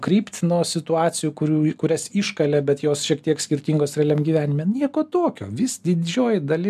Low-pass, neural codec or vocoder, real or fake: 14.4 kHz; none; real